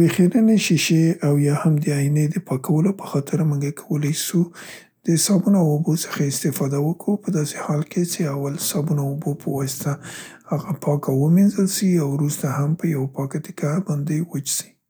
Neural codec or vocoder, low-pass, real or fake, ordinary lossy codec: none; none; real; none